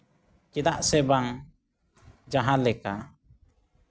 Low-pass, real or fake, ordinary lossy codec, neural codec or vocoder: none; real; none; none